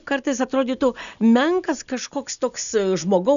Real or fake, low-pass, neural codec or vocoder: real; 7.2 kHz; none